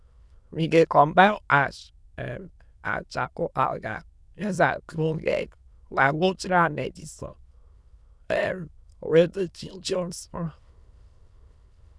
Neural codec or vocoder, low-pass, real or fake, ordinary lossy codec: autoencoder, 22.05 kHz, a latent of 192 numbers a frame, VITS, trained on many speakers; none; fake; none